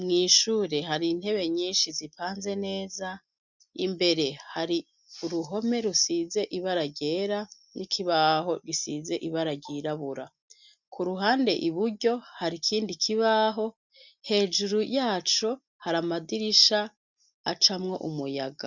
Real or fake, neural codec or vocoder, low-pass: real; none; 7.2 kHz